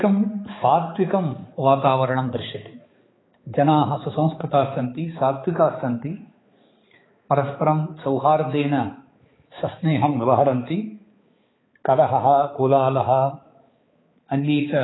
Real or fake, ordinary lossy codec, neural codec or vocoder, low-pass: fake; AAC, 16 kbps; codec, 16 kHz, 4 kbps, X-Codec, HuBERT features, trained on balanced general audio; 7.2 kHz